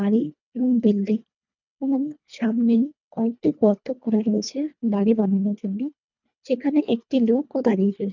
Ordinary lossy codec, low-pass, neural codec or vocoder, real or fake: none; 7.2 kHz; codec, 24 kHz, 1.5 kbps, HILCodec; fake